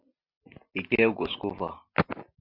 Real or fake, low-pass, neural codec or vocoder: real; 5.4 kHz; none